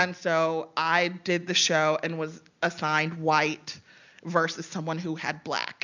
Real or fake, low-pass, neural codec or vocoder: real; 7.2 kHz; none